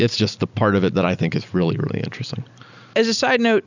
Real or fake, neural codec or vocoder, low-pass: real; none; 7.2 kHz